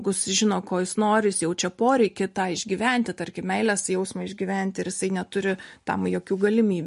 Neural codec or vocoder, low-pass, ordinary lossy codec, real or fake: none; 10.8 kHz; MP3, 48 kbps; real